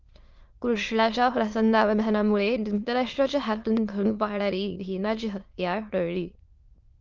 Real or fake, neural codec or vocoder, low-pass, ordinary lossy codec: fake; autoencoder, 22.05 kHz, a latent of 192 numbers a frame, VITS, trained on many speakers; 7.2 kHz; Opus, 32 kbps